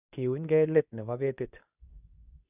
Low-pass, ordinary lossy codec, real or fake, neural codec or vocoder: 3.6 kHz; none; fake; codec, 24 kHz, 0.9 kbps, WavTokenizer, medium speech release version 2